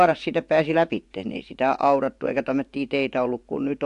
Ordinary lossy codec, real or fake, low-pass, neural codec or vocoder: AAC, 64 kbps; fake; 10.8 kHz; vocoder, 24 kHz, 100 mel bands, Vocos